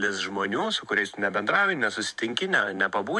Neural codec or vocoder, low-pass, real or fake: vocoder, 44.1 kHz, 128 mel bands every 512 samples, BigVGAN v2; 10.8 kHz; fake